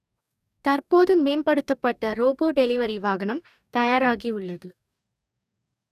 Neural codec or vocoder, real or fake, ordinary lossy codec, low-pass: codec, 44.1 kHz, 2.6 kbps, DAC; fake; none; 14.4 kHz